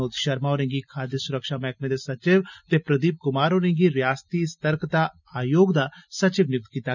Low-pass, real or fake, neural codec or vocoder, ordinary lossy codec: 7.2 kHz; real; none; none